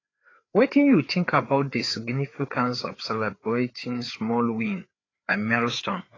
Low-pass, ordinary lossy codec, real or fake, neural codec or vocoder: 7.2 kHz; AAC, 32 kbps; fake; codec, 16 kHz, 4 kbps, FreqCodec, larger model